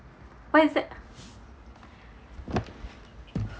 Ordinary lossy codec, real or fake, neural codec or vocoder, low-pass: none; real; none; none